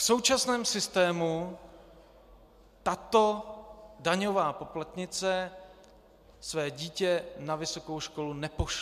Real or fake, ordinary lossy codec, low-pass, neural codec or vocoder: real; MP3, 96 kbps; 14.4 kHz; none